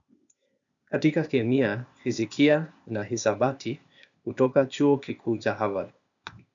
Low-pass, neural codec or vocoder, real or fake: 7.2 kHz; codec, 16 kHz, 0.8 kbps, ZipCodec; fake